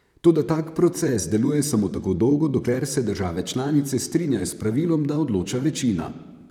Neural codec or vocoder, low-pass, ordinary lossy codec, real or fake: vocoder, 44.1 kHz, 128 mel bands, Pupu-Vocoder; 19.8 kHz; none; fake